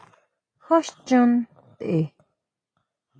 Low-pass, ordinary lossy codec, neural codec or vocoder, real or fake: 9.9 kHz; AAC, 32 kbps; none; real